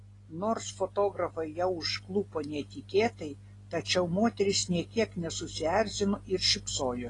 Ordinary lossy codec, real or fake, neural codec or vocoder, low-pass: AAC, 32 kbps; real; none; 10.8 kHz